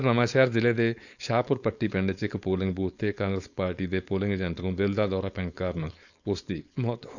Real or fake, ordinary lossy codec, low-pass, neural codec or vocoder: fake; none; 7.2 kHz; codec, 16 kHz, 4.8 kbps, FACodec